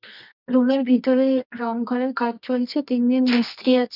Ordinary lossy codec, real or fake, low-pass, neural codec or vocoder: none; fake; 5.4 kHz; codec, 24 kHz, 0.9 kbps, WavTokenizer, medium music audio release